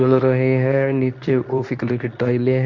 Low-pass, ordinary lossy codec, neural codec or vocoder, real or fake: 7.2 kHz; none; codec, 24 kHz, 0.9 kbps, WavTokenizer, medium speech release version 2; fake